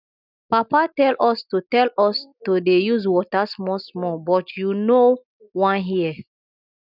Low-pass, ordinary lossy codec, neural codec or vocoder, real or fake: 5.4 kHz; none; none; real